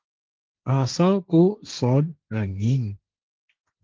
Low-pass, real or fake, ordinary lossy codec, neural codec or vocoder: 7.2 kHz; fake; Opus, 32 kbps; codec, 16 kHz, 1.1 kbps, Voila-Tokenizer